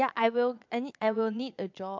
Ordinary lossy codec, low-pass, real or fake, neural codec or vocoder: MP3, 64 kbps; 7.2 kHz; fake; vocoder, 22.05 kHz, 80 mel bands, Vocos